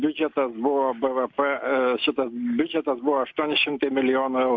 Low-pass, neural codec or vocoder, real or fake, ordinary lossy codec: 7.2 kHz; none; real; AAC, 48 kbps